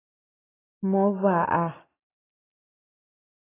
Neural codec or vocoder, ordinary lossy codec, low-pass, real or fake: none; AAC, 16 kbps; 3.6 kHz; real